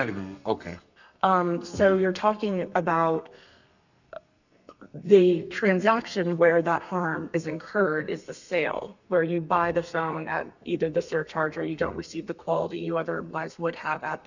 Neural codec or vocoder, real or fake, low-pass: codec, 32 kHz, 1.9 kbps, SNAC; fake; 7.2 kHz